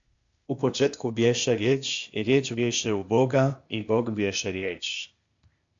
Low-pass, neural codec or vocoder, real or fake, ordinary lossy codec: 7.2 kHz; codec, 16 kHz, 0.8 kbps, ZipCodec; fake; AAC, 64 kbps